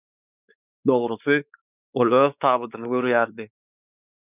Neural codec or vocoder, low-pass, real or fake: codec, 16 kHz, 4 kbps, X-Codec, WavLM features, trained on Multilingual LibriSpeech; 3.6 kHz; fake